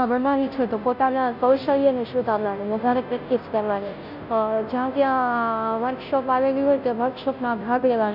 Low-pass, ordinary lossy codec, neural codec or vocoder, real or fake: 5.4 kHz; none; codec, 16 kHz, 0.5 kbps, FunCodec, trained on Chinese and English, 25 frames a second; fake